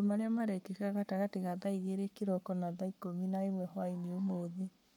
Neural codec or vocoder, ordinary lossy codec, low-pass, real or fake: codec, 44.1 kHz, 7.8 kbps, Pupu-Codec; none; 19.8 kHz; fake